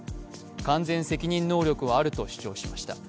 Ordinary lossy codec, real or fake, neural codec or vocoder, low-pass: none; real; none; none